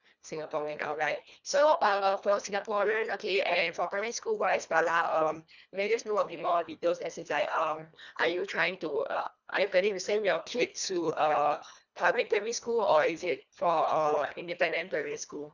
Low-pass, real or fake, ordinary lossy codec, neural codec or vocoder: 7.2 kHz; fake; none; codec, 24 kHz, 1.5 kbps, HILCodec